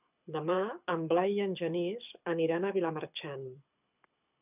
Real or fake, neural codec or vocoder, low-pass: fake; codec, 24 kHz, 6 kbps, HILCodec; 3.6 kHz